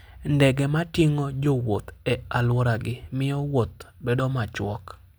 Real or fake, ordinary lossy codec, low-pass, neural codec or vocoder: real; none; none; none